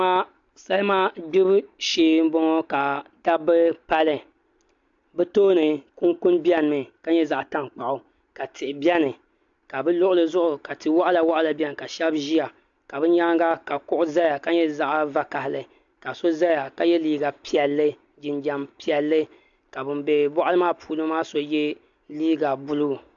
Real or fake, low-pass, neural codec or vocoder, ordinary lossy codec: real; 7.2 kHz; none; AAC, 64 kbps